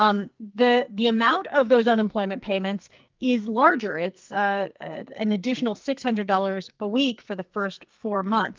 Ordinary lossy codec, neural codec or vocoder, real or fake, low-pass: Opus, 24 kbps; codec, 44.1 kHz, 2.6 kbps, SNAC; fake; 7.2 kHz